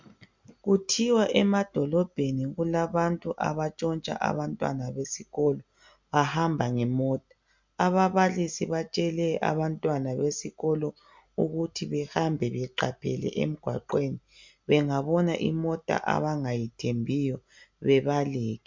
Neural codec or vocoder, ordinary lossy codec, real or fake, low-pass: none; MP3, 64 kbps; real; 7.2 kHz